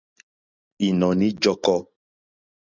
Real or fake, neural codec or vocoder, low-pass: real; none; 7.2 kHz